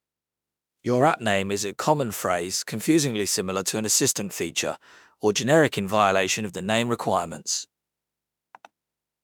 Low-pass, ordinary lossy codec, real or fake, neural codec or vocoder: none; none; fake; autoencoder, 48 kHz, 32 numbers a frame, DAC-VAE, trained on Japanese speech